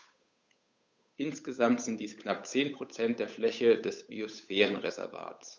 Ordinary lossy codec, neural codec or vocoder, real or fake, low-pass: none; codec, 16 kHz, 8 kbps, FunCodec, trained on Chinese and English, 25 frames a second; fake; none